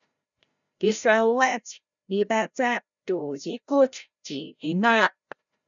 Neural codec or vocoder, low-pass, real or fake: codec, 16 kHz, 0.5 kbps, FreqCodec, larger model; 7.2 kHz; fake